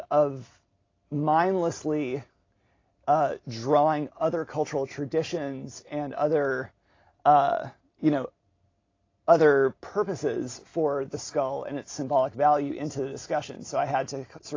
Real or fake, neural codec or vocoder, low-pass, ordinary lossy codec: real; none; 7.2 kHz; AAC, 32 kbps